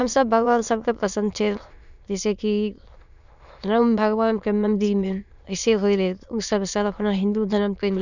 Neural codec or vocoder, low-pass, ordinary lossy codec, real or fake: autoencoder, 22.05 kHz, a latent of 192 numbers a frame, VITS, trained on many speakers; 7.2 kHz; none; fake